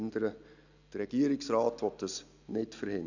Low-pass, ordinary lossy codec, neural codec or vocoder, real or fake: 7.2 kHz; none; none; real